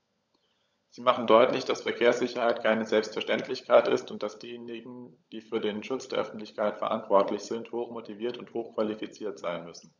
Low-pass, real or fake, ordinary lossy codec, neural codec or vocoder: 7.2 kHz; fake; none; codec, 16 kHz, 16 kbps, FunCodec, trained on LibriTTS, 50 frames a second